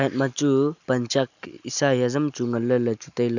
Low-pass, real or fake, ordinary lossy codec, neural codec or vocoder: 7.2 kHz; real; none; none